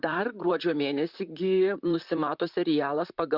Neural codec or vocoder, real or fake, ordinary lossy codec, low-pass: vocoder, 44.1 kHz, 128 mel bands, Pupu-Vocoder; fake; Opus, 64 kbps; 5.4 kHz